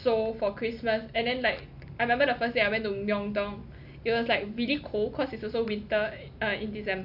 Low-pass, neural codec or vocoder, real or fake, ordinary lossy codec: 5.4 kHz; none; real; none